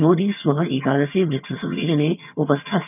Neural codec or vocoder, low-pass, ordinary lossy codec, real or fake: vocoder, 22.05 kHz, 80 mel bands, HiFi-GAN; 3.6 kHz; none; fake